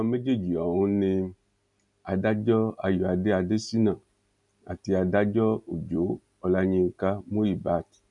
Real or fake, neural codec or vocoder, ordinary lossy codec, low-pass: real; none; none; 10.8 kHz